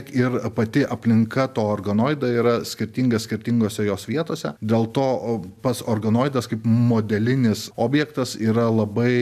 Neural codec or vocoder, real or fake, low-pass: none; real; 14.4 kHz